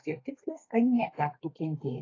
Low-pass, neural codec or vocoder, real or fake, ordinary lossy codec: 7.2 kHz; codec, 32 kHz, 1.9 kbps, SNAC; fake; AAC, 32 kbps